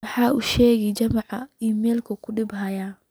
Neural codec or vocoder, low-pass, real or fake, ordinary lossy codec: none; none; real; none